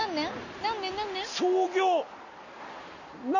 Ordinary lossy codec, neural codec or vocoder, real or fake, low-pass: none; none; real; 7.2 kHz